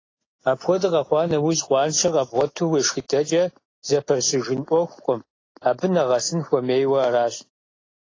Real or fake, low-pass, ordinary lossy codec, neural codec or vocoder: real; 7.2 kHz; AAC, 32 kbps; none